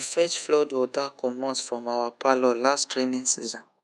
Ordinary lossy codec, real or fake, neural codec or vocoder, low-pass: none; fake; codec, 24 kHz, 1.2 kbps, DualCodec; none